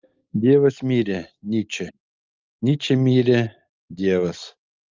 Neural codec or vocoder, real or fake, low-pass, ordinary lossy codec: none; real; 7.2 kHz; Opus, 24 kbps